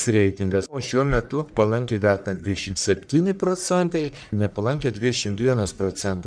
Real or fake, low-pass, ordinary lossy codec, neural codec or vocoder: fake; 9.9 kHz; MP3, 96 kbps; codec, 44.1 kHz, 1.7 kbps, Pupu-Codec